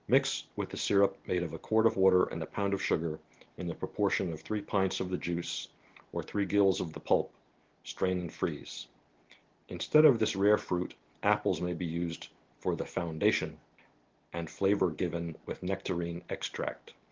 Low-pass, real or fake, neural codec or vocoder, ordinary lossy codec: 7.2 kHz; real; none; Opus, 16 kbps